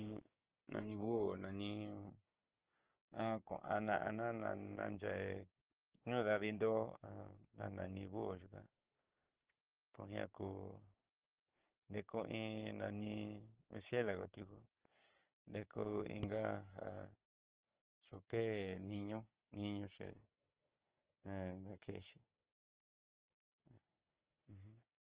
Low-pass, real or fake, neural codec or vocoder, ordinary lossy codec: 3.6 kHz; fake; codec, 16 kHz, 6 kbps, DAC; Opus, 16 kbps